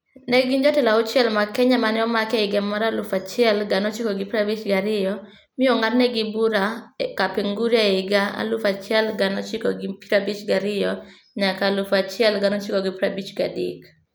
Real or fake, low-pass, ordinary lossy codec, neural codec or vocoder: real; none; none; none